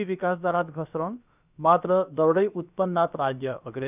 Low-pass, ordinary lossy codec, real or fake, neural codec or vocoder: 3.6 kHz; none; fake; codec, 16 kHz, about 1 kbps, DyCAST, with the encoder's durations